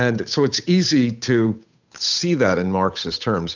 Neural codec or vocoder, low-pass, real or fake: codec, 16 kHz, 8 kbps, FunCodec, trained on Chinese and English, 25 frames a second; 7.2 kHz; fake